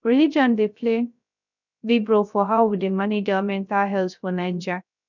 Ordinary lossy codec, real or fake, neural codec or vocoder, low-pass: none; fake; codec, 16 kHz, 0.3 kbps, FocalCodec; 7.2 kHz